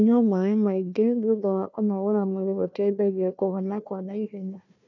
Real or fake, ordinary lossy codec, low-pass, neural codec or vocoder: fake; none; 7.2 kHz; codec, 16 kHz, 1 kbps, FunCodec, trained on Chinese and English, 50 frames a second